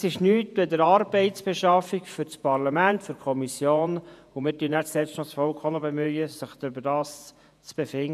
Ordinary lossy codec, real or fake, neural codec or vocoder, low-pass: none; fake; vocoder, 48 kHz, 128 mel bands, Vocos; 14.4 kHz